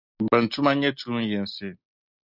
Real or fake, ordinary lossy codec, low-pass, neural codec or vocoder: real; Opus, 64 kbps; 5.4 kHz; none